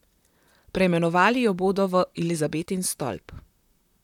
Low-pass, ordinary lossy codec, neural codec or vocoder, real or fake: 19.8 kHz; none; vocoder, 44.1 kHz, 128 mel bands, Pupu-Vocoder; fake